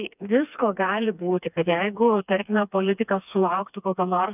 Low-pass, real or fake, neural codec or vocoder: 3.6 kHz; fake; codec, 16 kHz, 2 kbps, FreqCodec, smaller model